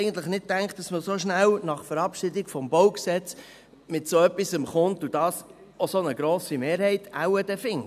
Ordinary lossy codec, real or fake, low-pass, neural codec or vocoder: none; real; 14.4 kHz; none